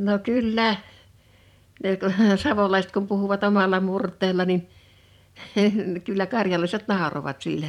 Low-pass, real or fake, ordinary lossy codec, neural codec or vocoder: 19.8 kHz; fake; none; vocoder, 44.1 kHz, 128 mel bands every 512 samples, BigVGAN v2